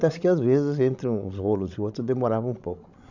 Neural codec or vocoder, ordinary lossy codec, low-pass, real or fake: codec, 16 kHz, 16 kbps, FreqCodec, larger model; none; 7.2 kHz; fake